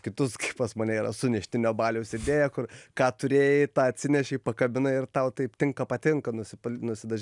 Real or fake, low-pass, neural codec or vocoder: real; 10.8 kHz; none